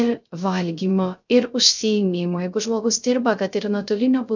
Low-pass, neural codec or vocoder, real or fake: 7.2 kHz; codec, 16 kHz, 0.3 kbps, FocalCodec; fake